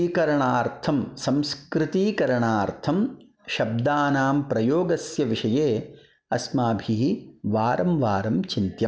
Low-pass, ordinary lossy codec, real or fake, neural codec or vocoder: none; none; real; none